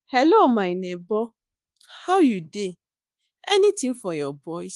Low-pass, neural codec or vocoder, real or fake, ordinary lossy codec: 10.8 kHz; codec, 24 kHz, 1.2 kbps, DualCodec; fake; Opus, 24 kbps